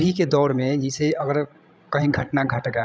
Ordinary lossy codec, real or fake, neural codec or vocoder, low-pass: none; fake; codec, 16 kHz, 16 kbps, FreqCodec, larger model; none